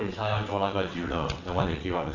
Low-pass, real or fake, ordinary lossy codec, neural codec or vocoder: 7.2 kHz; fake; none; vocoder, 22.05 kHz, 80 mel bands, WaveNeXt